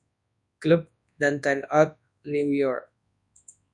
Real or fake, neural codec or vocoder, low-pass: fake; codec, 24 kHz, 0.9 kbps, WavTokenizer, large speech release; 10.8 kHz